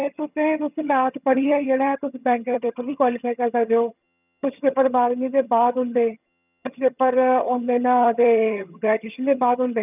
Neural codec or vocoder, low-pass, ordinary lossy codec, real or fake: vocoder, 22.05 kHz, 80 mel bands, HiFi-GAN; 3.6 kHz; none; fake